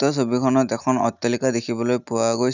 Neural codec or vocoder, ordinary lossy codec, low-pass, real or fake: none; none; 7.2 kHz; real